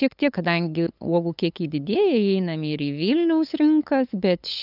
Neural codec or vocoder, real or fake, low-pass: codec, 16 kHz, 8 kbps, FunCodec, trained on LibriTTS, 25 frames a second; fake; 5.4 kHz